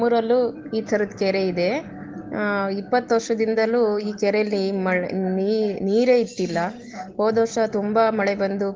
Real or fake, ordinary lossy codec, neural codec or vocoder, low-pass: real; Opus, 16 kbps; none; 7.2 kHz